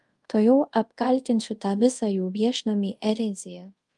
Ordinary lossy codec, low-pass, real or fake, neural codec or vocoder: Opus, 32 kbps; 10.8 kHz; fake; codec, 24 kHz, 0.5 kbps, DualCodec